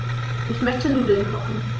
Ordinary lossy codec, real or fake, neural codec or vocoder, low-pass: none; fake; codec, 16 kHz, 16 kbps, FreqCodec, larger model; none